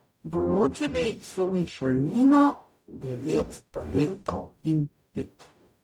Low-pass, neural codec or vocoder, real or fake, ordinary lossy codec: 19.8 kHz; codec, 44.1 kHz, 0.9 kbps, DAC; fake; none